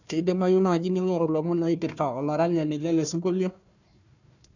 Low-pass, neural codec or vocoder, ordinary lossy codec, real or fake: 7.2 kHz; codec, 16 kHz, 1 kbps, FunCodec, trained on Chinese and English, 50 frames a second; none; fake